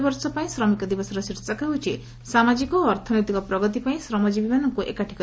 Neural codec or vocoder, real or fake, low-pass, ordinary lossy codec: none; real; none; none